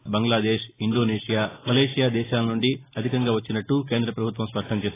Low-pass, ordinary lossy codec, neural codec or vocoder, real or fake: 3.6 kHz; AAC, 16 kbps; none; real